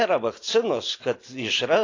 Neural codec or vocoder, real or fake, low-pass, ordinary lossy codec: none; real; 7.2 kHz; AAC, 32 kbps